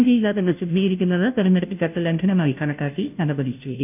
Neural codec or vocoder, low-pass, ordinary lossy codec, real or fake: codec, 16 kHz, 0.5 kbps, FunCodec, trained on Chinese and English, 25 frames a second; 3.6 kHz; none; fake